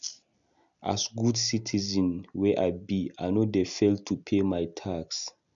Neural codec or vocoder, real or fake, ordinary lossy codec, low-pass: none; real; none; 7.2 kHz